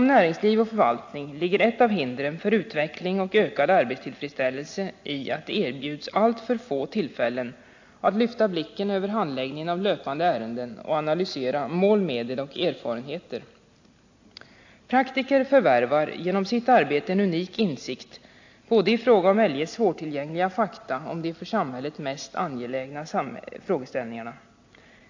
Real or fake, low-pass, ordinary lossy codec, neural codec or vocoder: real; 7.2 kHz; AAC, 48 kbps; none